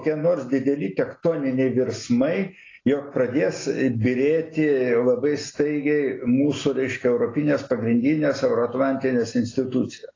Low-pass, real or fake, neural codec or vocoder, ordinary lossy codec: 7.2 kHz; real; none; AAC, 32 kbps